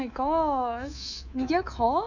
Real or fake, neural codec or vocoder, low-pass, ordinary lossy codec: fake; codec, 24 kHz, 3.1 kbps, DualCodec; 7.2 kHz; none